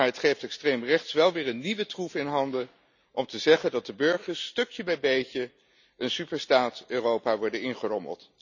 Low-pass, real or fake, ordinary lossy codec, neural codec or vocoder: 7.2 kHz; real; none; none